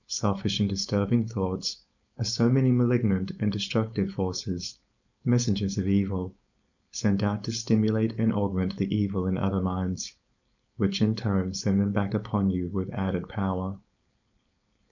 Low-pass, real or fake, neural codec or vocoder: 7.2 kHz; fake; codec, 16 kHz, 4.8 kbps, FACodec